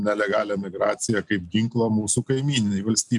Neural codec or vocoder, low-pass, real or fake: none; 10.8 kHz; real